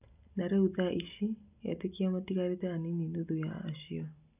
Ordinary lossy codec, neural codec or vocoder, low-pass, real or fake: none; none; 3.6 kHz; real